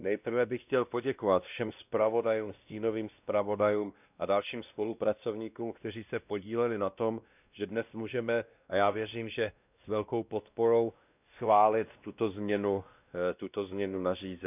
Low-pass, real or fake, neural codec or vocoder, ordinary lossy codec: 3.6 kHz; fake; codec, 16 kHz, 1 kbps, X-Codec, WavLM features, trained on Multilingual LibriSpeech; none